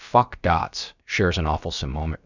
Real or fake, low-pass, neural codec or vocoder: fake; 7.2 kHz; codec, 16 kHz, about 1 kbps, DyCAST, with the encoder's durations